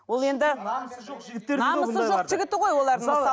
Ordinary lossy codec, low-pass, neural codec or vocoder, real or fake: none; none; none; real